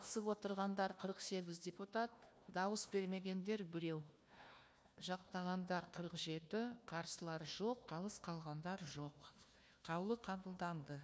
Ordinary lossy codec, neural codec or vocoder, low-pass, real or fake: none; codec, 16 kHz, 1 kbps, FunCodec, trained on Chinese and English, 50 frames a second; none; fake